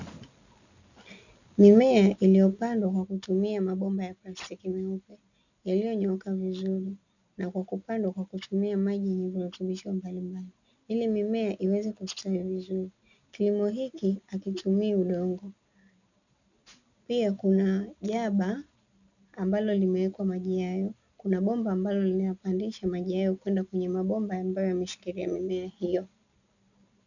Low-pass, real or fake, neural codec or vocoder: 7.2 kHz; real; none